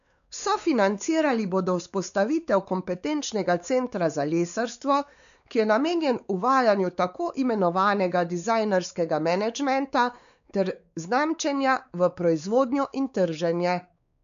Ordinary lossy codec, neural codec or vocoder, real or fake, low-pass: none; codec, 16 kHz, 4 kbps, X-Codec, WavLM features, trained on Multilingual LibriSpeech; fake; 7.2 kHz